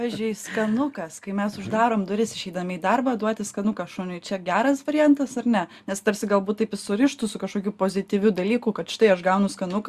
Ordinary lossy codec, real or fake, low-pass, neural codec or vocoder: Opus, 64 kbps; real; 14.4 kHz; none